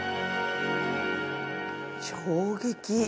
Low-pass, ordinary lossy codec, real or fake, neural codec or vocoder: none; none; real; none